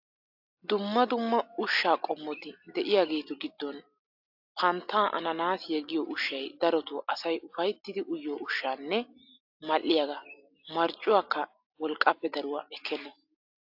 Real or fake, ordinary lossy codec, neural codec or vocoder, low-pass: real; AAC, 48 kbps; none; 5.4 kHz